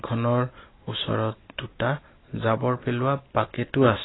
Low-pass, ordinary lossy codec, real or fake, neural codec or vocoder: 7.2 kHz; AAC, 16 kbps; real; none